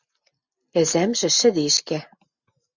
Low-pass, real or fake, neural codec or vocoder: 7.2 kHz; real; none